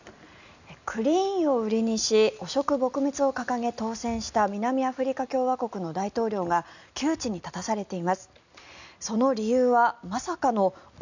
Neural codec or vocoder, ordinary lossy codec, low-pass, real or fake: none; none; 7.2 kHz; real